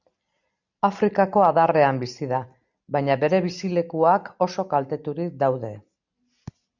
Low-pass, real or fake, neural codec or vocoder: 7.2 kHz; real; none